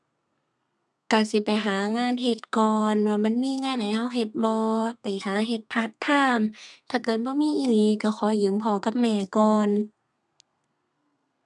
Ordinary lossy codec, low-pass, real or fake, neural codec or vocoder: none; 10.8 kHz; fake; codec, 32 kHz, 1.9 kbps, SNAC